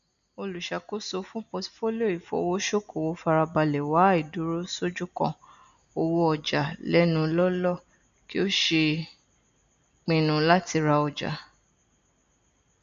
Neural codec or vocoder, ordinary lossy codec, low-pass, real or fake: none; none; 7.2 kHz; real